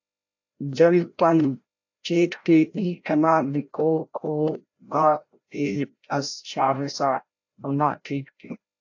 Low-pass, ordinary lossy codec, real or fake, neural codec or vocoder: 7.2 kHz; AAC, 48 kbps; fake; codec, 16 kHz, 0.5 kbps, FreqCodec, larger model